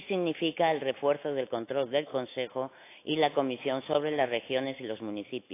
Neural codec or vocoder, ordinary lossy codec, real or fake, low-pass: autoencoder, 48 kHz, 128 numbers a frame, DAC-VAE, trained on Japanese speech; AAC, 24 kbps; fake; 3.6 kHz